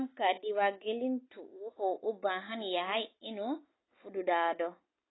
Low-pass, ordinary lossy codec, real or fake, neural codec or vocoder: 7.2 kHz; AAC, 16 kbps; real; none